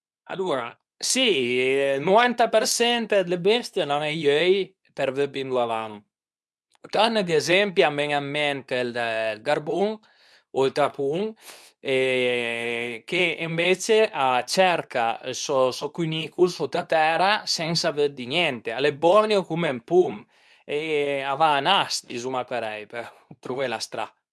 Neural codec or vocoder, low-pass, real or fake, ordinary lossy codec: codec, 24 kHz, 0.9 kbps, WavTokenizer, medium speech release version 2; none; fake; none